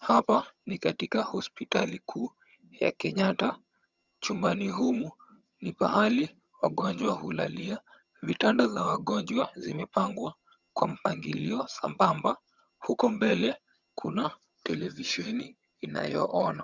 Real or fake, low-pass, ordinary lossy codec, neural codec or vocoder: fake; 7.2 kHz; Opus, 64 kbps; vocoder, 22.05 kHz, 80 mel bands, HiFi-GAN